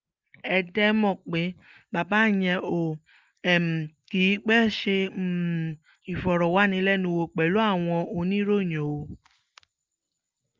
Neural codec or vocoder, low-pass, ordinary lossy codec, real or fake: none; 7.2 kHz; Opus, 32 kbps; real